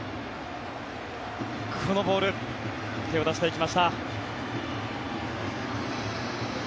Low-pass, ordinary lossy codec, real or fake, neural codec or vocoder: none; none; real; none